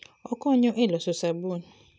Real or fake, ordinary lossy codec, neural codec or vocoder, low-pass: real; none; none; none